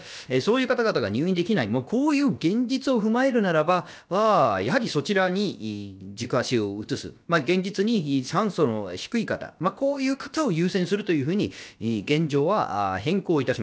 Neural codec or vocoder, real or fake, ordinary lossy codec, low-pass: codec, 16 kHz, about 1 kbps, DyCAST, with the encoder's durations; fake; none; none